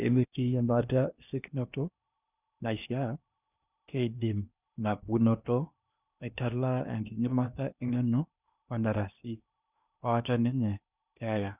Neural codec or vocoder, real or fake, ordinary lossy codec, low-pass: codec, 16 kHz in and 24 kHz out, 0.8 kbps, FocalCodec, streaming, 65536 codes; fake; none; 3.6 kHz